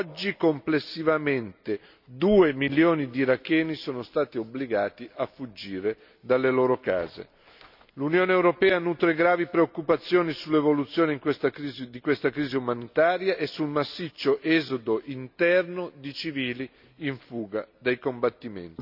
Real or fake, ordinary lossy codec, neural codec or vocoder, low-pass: real; none; none; 5.4 kHz